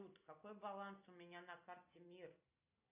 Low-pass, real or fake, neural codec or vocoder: 3.6 kHz; real; none